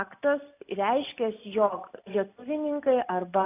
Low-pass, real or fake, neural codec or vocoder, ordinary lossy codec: 3.6 kHz; real; none; AAC, 24 kbps